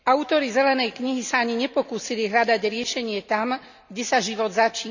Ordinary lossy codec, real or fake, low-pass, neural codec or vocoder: none; real; 7.2 kHz; none